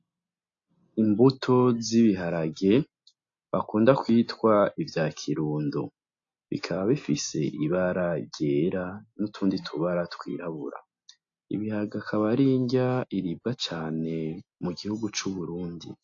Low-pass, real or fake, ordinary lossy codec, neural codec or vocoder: 7.2 kHz; real; AAC, 48 kbps; none